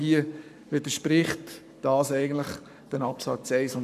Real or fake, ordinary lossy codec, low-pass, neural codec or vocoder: fake; none; 14.4 kHz; codec, 44.1 kHz, 7.8 kbps, Pupu-Codec